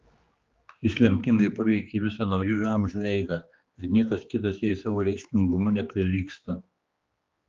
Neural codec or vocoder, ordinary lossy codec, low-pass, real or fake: codec, 16 kHz, 2 kbps, X-Codec, HuBERT features, trained on general audio; Opus, 32 kbps; 7.2 kHz; fake